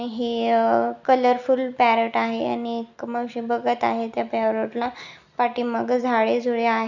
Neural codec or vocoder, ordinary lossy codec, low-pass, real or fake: none; none; 7.2 kHz; real